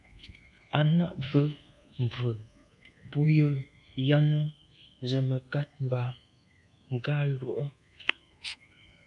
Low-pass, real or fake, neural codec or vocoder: 10.8 kHz; fake; codec, 24 kHz, 1.2 kbps, DualCodec